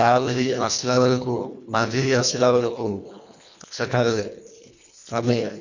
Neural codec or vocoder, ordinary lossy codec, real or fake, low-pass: codec, 24 kHz, 1.5 kbps, HILCodec; none; fake; 7.2 kHz